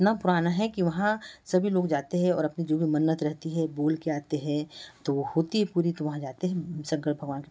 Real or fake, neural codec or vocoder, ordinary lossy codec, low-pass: real; none; none; none